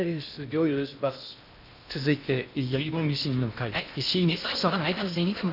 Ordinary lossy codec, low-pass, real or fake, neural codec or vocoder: none; 5.4 kHz; fake; codec, 16 kHz in and 24 kHz out, 0.6 kbps, FocalCodec, streaming, 2048 codes